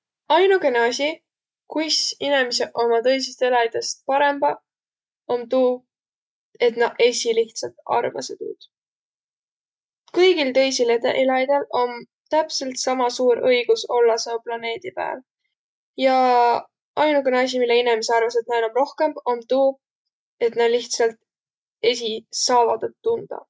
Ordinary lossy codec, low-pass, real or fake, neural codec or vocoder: none; none; real; none